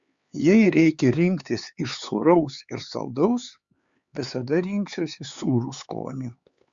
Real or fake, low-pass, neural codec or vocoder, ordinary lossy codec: fake; 7.2 kHz; codec, 16 kHz, 4 kbps, X-Codec, HuBERT features, trained on LibriSpeech; Opus, 64 kbps